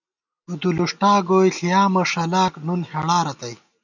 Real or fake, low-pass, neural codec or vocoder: real; 7.2 kHz; none